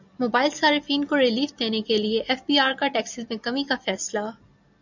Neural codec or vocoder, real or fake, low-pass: none; real; 7.2 kHz